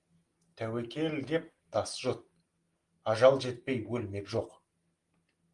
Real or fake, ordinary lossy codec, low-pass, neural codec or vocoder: real; Opus, 24 kbps; 10.8 kHz; none